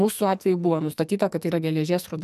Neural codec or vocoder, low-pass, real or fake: codec, 44.1 kHz, 2.6 kbps, SNAC; 14.4 kHz; fake